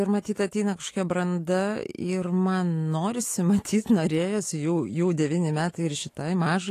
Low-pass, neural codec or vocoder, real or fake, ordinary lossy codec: 14.4 kHz; autoencoder, 48 kHz, 128 numbers a frame, DAC-VAE, trained on Japanese speech; fake; AAC, 48 kbps